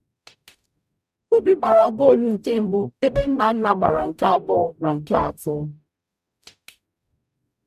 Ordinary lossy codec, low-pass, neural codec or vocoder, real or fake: none; 14.4 kHz; codec, 44.1 kHz, 0.9 kbps, DAC; fake